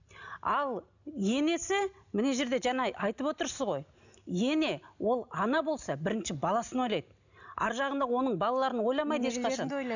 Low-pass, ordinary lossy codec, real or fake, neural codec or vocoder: 7.2 kHz; none; real; none